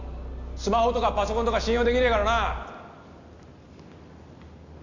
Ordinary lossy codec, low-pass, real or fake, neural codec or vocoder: none; 7.2 kHz; real; none